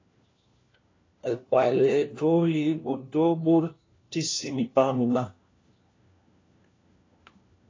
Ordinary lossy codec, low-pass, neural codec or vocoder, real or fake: AAC, 32 kbps; 7.2 kHz; codec, 16 kHz, 1 kbps, FunCodec, trained on LibriTTS, 50 frames a second; fake